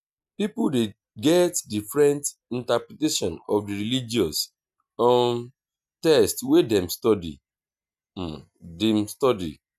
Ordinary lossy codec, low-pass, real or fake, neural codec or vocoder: none; 14.4 kHz; real; none